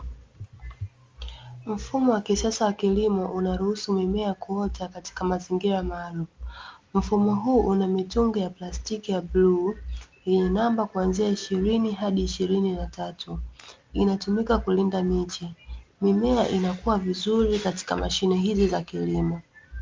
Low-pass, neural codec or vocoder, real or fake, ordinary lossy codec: 7.2 kHz; none; real; Opus, 32 kbps